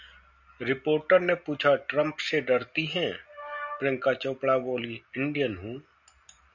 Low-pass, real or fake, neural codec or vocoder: 7.2 kHz; real; none